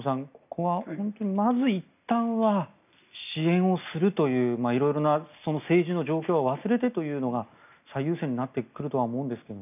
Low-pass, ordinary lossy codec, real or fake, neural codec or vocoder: 3.6 kHz; none; real; none